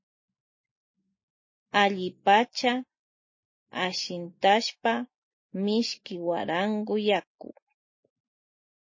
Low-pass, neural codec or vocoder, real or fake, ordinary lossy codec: 7.2 kHz; none; real; MP3, 32 kbps